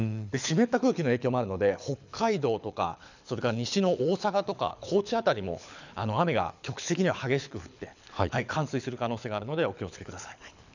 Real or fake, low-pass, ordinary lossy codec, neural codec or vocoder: fake; 7.2 kHz; none; codec, 24 kHz, 6 kbps, HILCodec